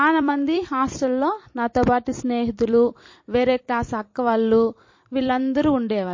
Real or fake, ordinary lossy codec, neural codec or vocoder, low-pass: fake; MP3, 32 kbps; vocoder, 44.1 kHz, 128 mel bands every 256 samples, BigVGAN v2; 7.2 kHz